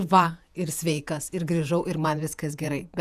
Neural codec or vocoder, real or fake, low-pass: vocoder, 48 kHz, 128 mel bands, Vocos; fake; 14.4 kHz